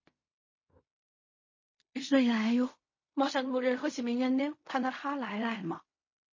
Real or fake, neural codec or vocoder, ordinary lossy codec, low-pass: fake; codec, 16 kHz in and 24 kHz out, 0.4 kbps, LongCat-Audio-Codec, fine tuned four codebook decoder; MP3, 32 kbps; 7.2 kHz